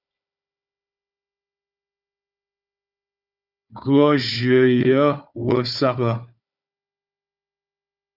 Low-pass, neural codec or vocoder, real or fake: 5.4 kHz; codec, 16 kHz, 4 kbps, FunCodec, trained on Chinese and English, 50 frames a second; fake